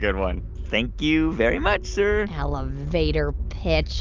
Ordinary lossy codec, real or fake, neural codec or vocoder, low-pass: Opus, 24 kbps; real; none; 7.2 kHz